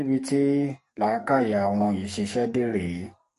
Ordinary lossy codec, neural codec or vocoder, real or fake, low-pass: MP3, 48 kbps; codec, 44.1 kHz, 2.6 kbps, SNAC; fake; 14.4 kHz